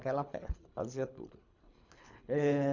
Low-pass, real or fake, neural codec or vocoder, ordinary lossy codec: 7.2 kHz; fake; codec, 24 kHz, 3 kbps, HILCodec; none